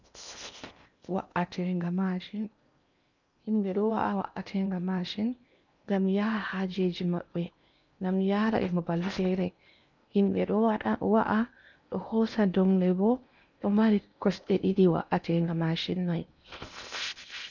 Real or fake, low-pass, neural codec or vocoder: fake; 7.2 kHz; codec, 16 kHz in and 24 kHz out, 0.8 kbps, FocalCodec, streaming, 65536 codes